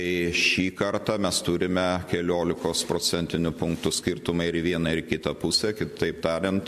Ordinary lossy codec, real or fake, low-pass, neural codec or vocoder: MP3, 64 kbps; real; 14.4 kHz; none